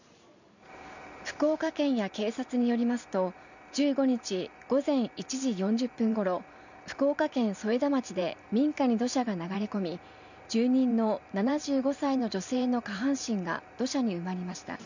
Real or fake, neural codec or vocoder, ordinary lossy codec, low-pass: fake; vocoder, 44.1 kHz, 128 mel bands every 512 samples, BigVGAN v2; none; 7.2 kHz